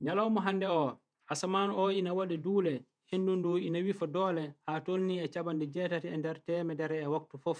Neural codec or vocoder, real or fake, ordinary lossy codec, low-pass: vocoder, 48 kHz, 128 mel bands, Vocos; fake; none; 9.9 kHz